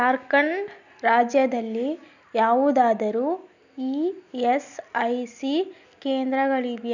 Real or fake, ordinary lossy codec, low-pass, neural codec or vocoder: real; none; 7.2 kHz; none